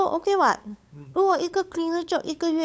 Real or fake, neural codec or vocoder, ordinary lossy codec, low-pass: fake; codec, 16 kHz, 8 kbps, FunCodec, trained on LibriTTS, 25 frames a second; none; none